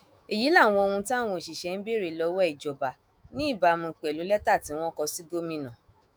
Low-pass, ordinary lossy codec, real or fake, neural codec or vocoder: none; none; fake; autoencoder, 48 kHz, 128 numbers a frame, DAC-VAE, trained on Japanese speech